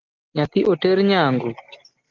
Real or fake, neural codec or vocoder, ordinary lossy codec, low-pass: real; none; Opus, 32 kbps; 7.2 kHz